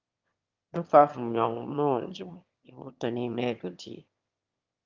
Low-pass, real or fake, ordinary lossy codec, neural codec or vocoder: 7.2 kHz; fake; Opus, 24 kbps; autoencoder, 22.05 kHz, a latent of 192 numbers a frame, VITS, trained on one speaker